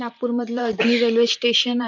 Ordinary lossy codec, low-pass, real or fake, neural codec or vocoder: none; 7.2 kHz; fake; vocoder, 44.1 kHz, 128 mel bands, Pupu-Vocoder